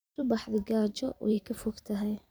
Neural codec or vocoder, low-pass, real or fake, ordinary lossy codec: vocoder, 44.1 kHz, 128 mel bands every 512 samples, BigVGAN v2; none; fake; none